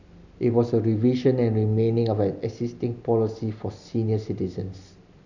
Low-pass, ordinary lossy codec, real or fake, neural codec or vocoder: 7.2 kHz; none; real; none